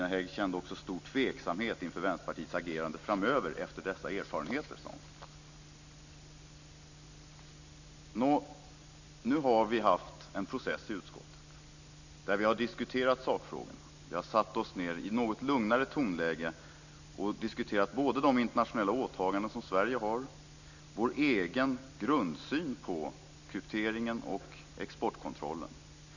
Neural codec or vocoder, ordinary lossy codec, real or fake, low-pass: none; none; real; 7.2 kHz